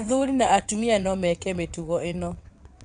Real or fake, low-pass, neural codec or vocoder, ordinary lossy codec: fake; 9.9 kHz; vocoder, 22.05 kHz, 80 mel bands, WaveNeXt; none